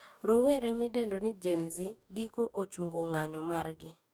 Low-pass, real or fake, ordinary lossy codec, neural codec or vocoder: none; fake; none; codec, 44.1 kHz, 2.6 kbps, DAC